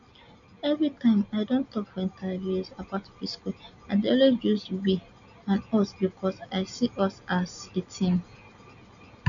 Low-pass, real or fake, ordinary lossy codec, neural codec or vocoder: 7.2 kHz; real; none; none